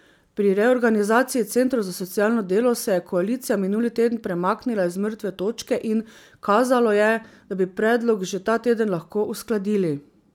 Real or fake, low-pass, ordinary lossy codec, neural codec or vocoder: real; 19.8 kHz; none; none